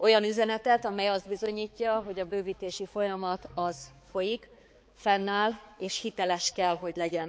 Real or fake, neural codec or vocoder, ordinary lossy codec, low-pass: fake; codec, 16 kHz, 4 kbps, X-Codec, HuBERT features, trained on balanced general audio; none; none